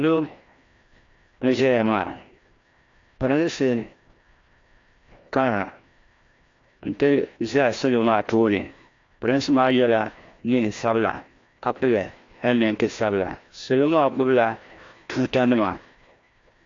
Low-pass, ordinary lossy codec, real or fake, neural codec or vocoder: 7.2 kHz; AAC, 48 kbps; fake; codec, 16 kHz, 1 kbps, FreqCodec, larger model